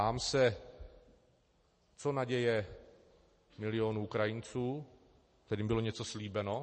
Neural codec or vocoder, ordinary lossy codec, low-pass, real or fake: none; MP3, 32 kbps; 10.8 kHz; real